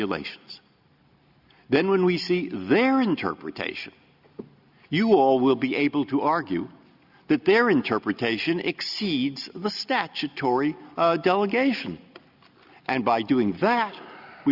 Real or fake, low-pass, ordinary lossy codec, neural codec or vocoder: real; 5.4 kHz; Opus, 64 kbps; none